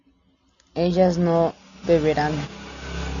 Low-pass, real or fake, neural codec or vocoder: 7.2 kHz; real; none